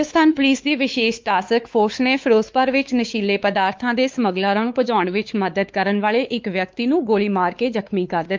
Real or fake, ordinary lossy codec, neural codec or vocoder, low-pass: fake; Opus, 32 kbps; codec, 16 kHz, 4 kbps, X-Codec, WavLM features, trained on Multilingual LibriSpeech; 7.2 kHz